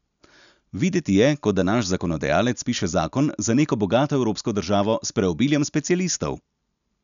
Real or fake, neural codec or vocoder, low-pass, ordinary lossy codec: real; none; 7.2 kHz; none